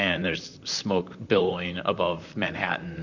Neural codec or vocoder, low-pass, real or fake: vocoder, 44.1 kHz, 128 mel bands, Pupu-Vocoder; 7.2 kHz; fake